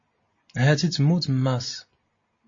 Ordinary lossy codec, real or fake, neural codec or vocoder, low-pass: MP3, 32 kbps; real; none; 7.2 kHz